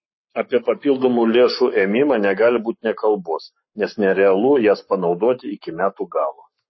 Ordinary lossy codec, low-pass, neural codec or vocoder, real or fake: MP3, 24 kbps; 7.2 kHz; codec, 44.1 kHz, 7.8 kbps, Pupu-Codec; fake